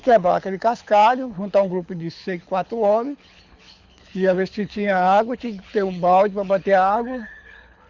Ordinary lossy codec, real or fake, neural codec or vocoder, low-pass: Opus, 64 kbps; fake; codec, 24 kHz, 6 kbps, HILCodec; 7.2 kHz